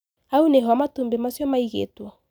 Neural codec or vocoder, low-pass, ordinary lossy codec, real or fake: none; none; none; real